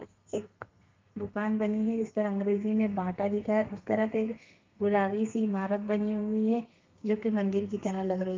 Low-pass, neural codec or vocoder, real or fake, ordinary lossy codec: 7.2 kHz; codec, 32 kHz, 1.9 kbps, SNAC; fake; Opus, 24 kbps